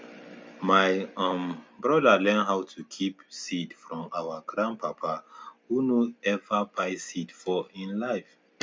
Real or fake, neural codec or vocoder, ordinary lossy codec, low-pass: real; none; none; none